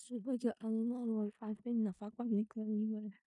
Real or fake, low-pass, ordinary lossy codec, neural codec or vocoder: fake; 10.8 kHz; none; codec, 16 kHz in and 24 kHz out, 0.4 kbps, LongCat-Audio-Codec, four codebook decoder